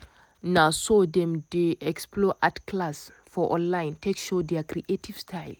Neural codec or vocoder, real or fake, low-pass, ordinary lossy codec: none; real; none; none